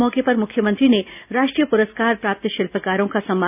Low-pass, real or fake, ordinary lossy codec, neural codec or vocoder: 3.6 kHz; real; none; none